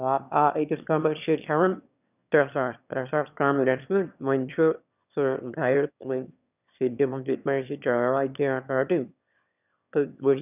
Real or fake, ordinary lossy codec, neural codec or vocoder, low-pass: fake; none; autoencoder, 22.05 kHz, a latent of 192 numbers a frame, VITS, trained on one speaker; 3.6 kHz